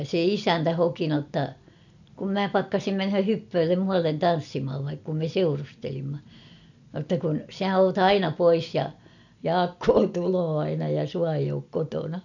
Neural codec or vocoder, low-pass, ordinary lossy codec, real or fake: none; 7.2 kHz; none; real